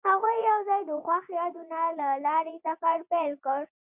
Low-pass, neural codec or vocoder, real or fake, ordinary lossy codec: 3.6 kHz; vocoder, 24 kHz, 100 mel bands, Vocos; fake; Opus, 64 kbps